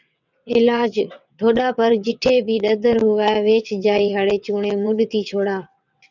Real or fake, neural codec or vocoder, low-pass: fake; vocoder, 22.05 kHz, 80 mel bands, WaveNeXt; 7.2 kHz